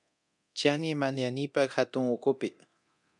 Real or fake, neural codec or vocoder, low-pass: fake; codec, 24 kHz, 0.9 kbps, DualCodec; 10.8 kHz